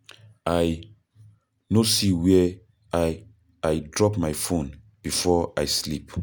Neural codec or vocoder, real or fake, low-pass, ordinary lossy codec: none; real; none; none